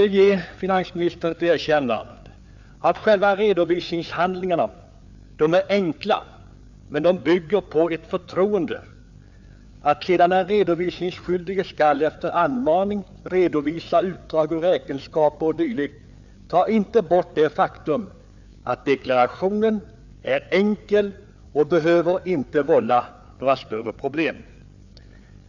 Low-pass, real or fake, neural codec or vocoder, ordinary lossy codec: 7.2 kHz; fake; codec, 16 kHz, 4 kbps, FreqCodec, larger model; none